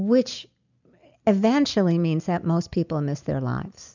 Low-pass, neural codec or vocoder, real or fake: 7.2 kHz; none; real